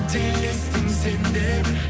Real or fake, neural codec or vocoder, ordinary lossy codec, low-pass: real; none; none; none